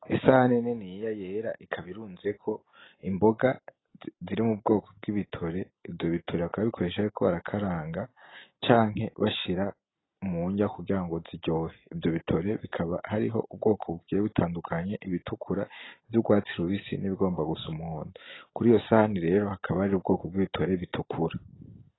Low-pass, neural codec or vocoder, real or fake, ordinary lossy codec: 7.2 kHz; none; real; AAC, 16 kbps